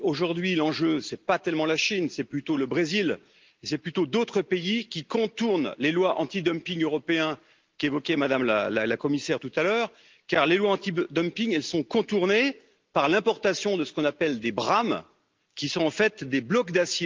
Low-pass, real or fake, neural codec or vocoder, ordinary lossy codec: 7.2 kHz; real; none; Opus, 32 kbps